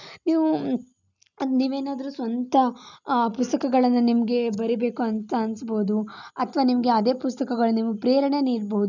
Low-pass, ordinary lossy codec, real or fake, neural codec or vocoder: 7.2 kHz; none; real; none